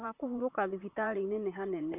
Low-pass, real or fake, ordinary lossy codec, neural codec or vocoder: 3.6 kHz; fake; none; vocoder, 44.1 kHz, 128 mel bands, Pupu-Vocoder